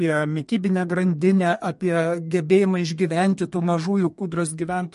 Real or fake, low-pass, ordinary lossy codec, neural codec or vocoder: fake; 14.4 kHz; MP3, 48 kbps; codec, 32 kHz, 1.9 kbps, SNAC